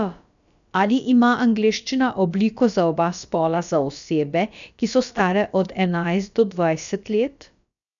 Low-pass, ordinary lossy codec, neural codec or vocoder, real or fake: 7.2 kHz; none; codec, 16 kHz, about 1 kbps, DyCAST, with the encoder's durations; fake